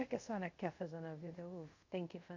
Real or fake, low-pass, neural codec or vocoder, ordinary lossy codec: fake; 7.2 kHz; codec, 24 kHz, 0.5 kbps, DualCodec; none